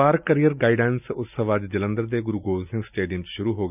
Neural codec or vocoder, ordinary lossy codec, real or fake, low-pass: none; none; real; 3.6 kHz